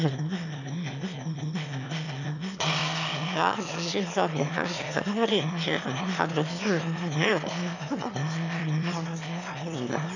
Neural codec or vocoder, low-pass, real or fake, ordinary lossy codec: autoencoder, 22.05 kHz, a latent of 192 numbers a frame, VITS, trained on one speaker; 7.2 kHz; fake; none